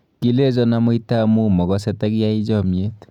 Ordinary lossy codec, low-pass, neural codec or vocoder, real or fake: none; 19.8 kHz; none; real